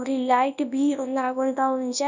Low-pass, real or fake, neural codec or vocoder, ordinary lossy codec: 7.2 kHz; fake; codec, 24 kHz, 0.9 kbps, WavTokenizer, large speech release; none